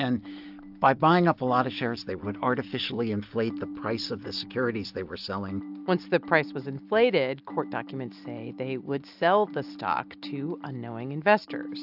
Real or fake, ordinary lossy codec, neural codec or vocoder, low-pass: real; AAC, 48 kbps; none; 5.4 kHz